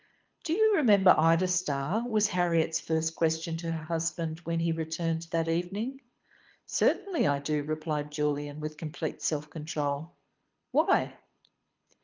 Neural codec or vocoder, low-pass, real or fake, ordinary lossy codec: codec, 24 kHz, 6 kbps, HILCodec; 7.2 kHz; fake; Opus, 24 kbps